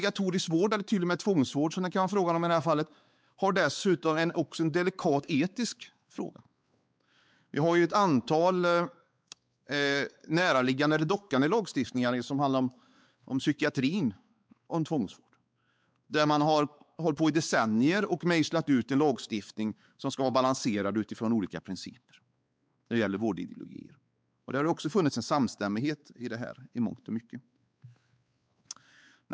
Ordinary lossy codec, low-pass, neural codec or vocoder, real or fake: none; none; codec, 16 kHz, 4 kbps, X-Codec, WavLM features, trained on Multilingual LibriSpeech; fake